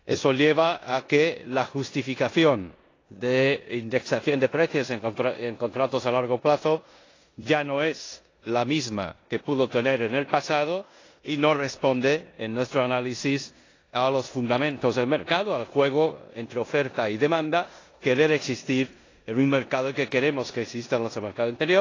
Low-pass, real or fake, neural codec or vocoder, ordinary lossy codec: 7.2 kHz; fake; codec, 16 kHz in and 24 kHz out, 0.9 kbps, LongCat-Audio-Codec, four codebook decoder; AAC, 32 kbps